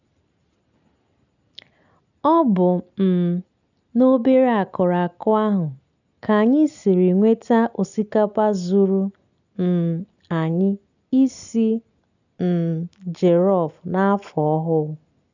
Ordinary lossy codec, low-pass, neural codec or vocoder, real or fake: none; 7.2 kHz; none; real